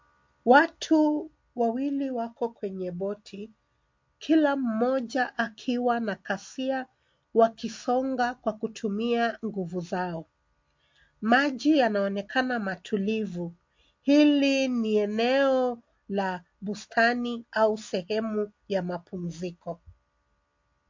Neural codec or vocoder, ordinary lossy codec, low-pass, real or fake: none; MP3, 48 kbps; 7.2 kHz; real